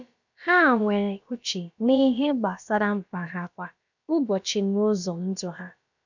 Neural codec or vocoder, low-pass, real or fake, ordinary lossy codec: codec, 16 kHz, about 1 kbps, DyCAST, with the encoder's durations; 7.2 kHz; fake; none